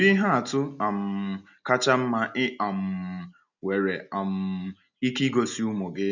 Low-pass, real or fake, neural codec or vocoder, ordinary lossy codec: 7.2 kHz; real; none; none